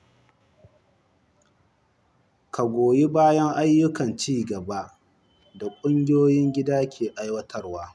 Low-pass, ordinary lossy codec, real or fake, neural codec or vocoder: none; none; real; none